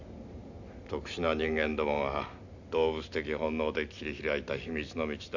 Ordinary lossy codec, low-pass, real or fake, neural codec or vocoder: none; 7.2 kHz; real; none